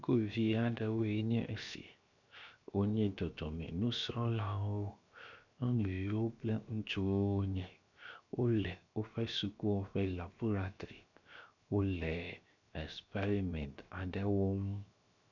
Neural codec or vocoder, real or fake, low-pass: codec, 16 kHz, 0.7 kbps, FocalCodec; fake; 7.2 kHz